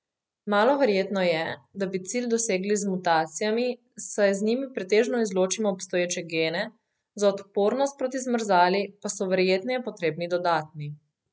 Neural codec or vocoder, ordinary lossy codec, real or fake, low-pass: none; none; real; none